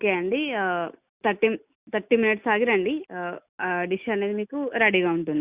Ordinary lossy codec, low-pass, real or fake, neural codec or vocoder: Opus, 64 kbps; 3.6 kHz; real; none